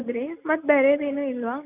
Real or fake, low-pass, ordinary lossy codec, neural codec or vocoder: real; 3.6 kHz; none; none